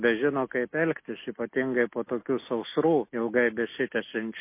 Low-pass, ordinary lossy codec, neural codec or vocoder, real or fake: 3.6 kHz; MP3, 24 kbps; none; real